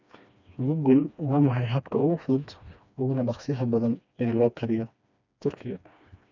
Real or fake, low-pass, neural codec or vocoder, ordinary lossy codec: fake; 7.2 kHz; codec, 16 kHz, 2 kbps, FreqCodec, smaller model; none